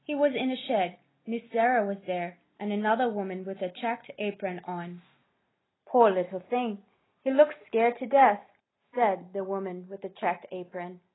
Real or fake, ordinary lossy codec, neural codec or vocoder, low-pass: real; AAC, 16 kbps; none; 7.2 kHz